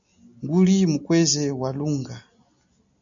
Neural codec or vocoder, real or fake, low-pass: none; real; 7.2 kHz